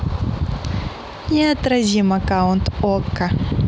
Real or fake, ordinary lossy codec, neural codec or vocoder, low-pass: real; none; none; none